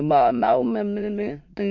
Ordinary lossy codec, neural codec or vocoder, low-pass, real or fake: MP3, 48 kbps; autoencoder, 22.05 kHz, a latent of 192 numbers a frame, VITS, trained on many speakers; 7.2 kHz; fake